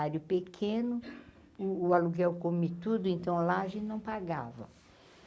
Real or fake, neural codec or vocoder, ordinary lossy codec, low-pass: real; none; none; none